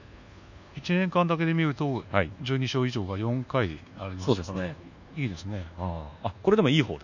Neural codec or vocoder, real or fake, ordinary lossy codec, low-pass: codec, 24 kHz, 1.2 kbps, DualCodec; fake; none; 7.2 kHz